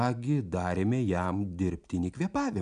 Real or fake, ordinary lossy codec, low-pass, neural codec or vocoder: real; MP3, 96 kbps; 9.9 kHz; none